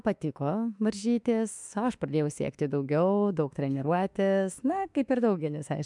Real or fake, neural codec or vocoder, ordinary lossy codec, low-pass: fake; autoencoder, 48 kHz, 32 numbers a frame, DAC-VAE, trained on Japanese speech; MP3, 96 kbps; 10.8 kHz